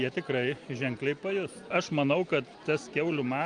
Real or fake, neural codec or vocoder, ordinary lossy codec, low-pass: real; none; Opus, 32 kbps; 9.9 kHz